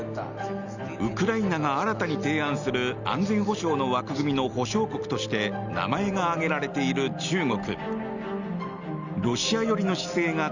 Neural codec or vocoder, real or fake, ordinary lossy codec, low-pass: none; real; Opus, 64 kbps; 7.2 kHz